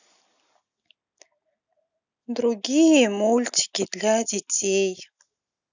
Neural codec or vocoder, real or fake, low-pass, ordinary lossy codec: none; real; 7.2 kHz; none